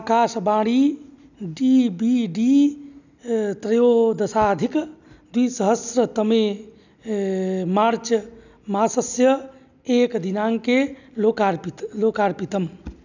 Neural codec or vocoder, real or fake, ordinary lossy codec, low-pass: none; real; none; 7.2 kHz